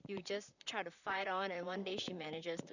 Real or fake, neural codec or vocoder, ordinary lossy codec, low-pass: fake; vocoder, 44.1 kHz, 128 mel bands, Pupu-Vocoder; none; 7.2 kHz